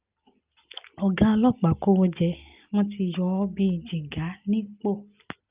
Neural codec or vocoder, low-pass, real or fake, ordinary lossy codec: none; 3.6 kHz; real; Opus, 24 kbps